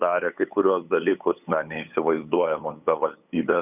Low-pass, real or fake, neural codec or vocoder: 3.6 kHz; fake; codec, 16 kHz, 8 kbps, FunCodec, trained on LibriTTS, 25 frames a second